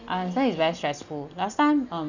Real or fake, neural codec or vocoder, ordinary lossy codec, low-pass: real; none; none; 7.2 kHz